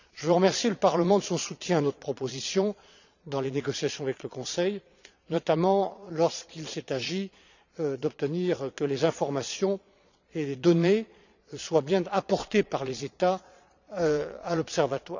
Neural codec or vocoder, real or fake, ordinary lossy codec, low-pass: vocoder, 22.05 kHz, 80 mel bands, Vocos; fake; none; 7.2 kHz